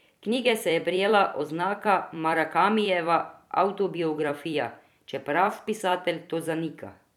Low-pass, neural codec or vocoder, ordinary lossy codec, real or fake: 19.8 kHz; vocoder, 44.1 kHz, 128 mel bands every 256 samples, BigVGAN v2; none; fake